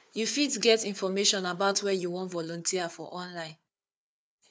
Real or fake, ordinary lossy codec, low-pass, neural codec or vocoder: fake; none; none; codec, 16 kHz, 4 kbps, FunCodec, trained on Chinese and English, 50 frames a second